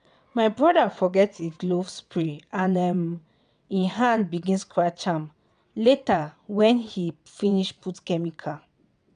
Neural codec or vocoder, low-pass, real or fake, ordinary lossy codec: vocoder, 22.05 kHz, 80 mel bands, WaveNeXt; 9.9 kHz; fake; none